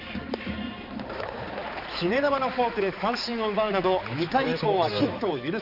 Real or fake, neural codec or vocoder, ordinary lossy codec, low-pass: fake; codec, 16 kHz, 4 kbps, X-Codec, HuBERT features, trained on balanced general audio; none; 5.4 kHz